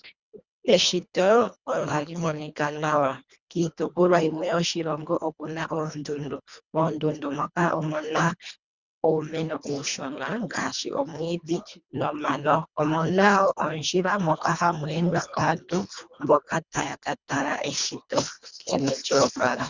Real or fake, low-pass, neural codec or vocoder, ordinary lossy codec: fake; 7.2 kHz; codec, 24 kHz, 1.5 kbps, HILCodec; Opus, 64 kbps